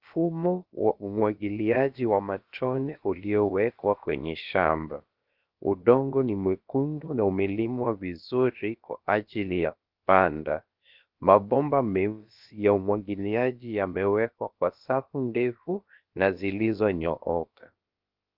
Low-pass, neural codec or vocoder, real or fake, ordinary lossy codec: 5.4 kHz; codec, 16 kHz, about 1 kbps, DyCAST, with the encoder's durations; fake; Opus, 32 kbps